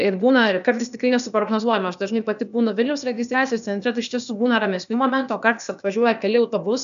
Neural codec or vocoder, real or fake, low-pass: codec, 16 kHz, 0.8 kbps, ZipCodec; fake; 7.2 kHz